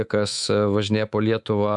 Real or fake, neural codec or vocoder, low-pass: fake; codec, 24 kHz, 3.1 kbps, DualCodec; 10.8 kHz